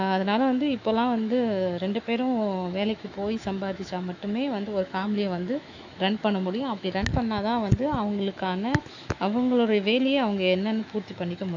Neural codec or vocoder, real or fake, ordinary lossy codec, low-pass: autoencoder, 48 kHz, 128 numbers a frame, DAC-VAE, trained on Japanese speech; fake; AAC, 48 kbps; 7.2 kHz